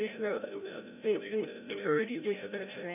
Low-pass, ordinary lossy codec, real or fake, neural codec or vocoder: 3.6 kHz; none; fake; codec, 16 kHz, 0.5 kbps, FreqCodec, larger model